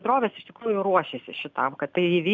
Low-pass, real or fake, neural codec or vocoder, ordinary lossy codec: 7.2 kHz; real; none; MP3, 64 kbps